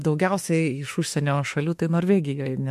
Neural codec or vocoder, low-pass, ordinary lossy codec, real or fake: autoencoder, 48 kHz, 32 numbers a frame, DAC-VAE, trained on Japanese speech; 14.4 kHz; MP3, 64 kbps; fake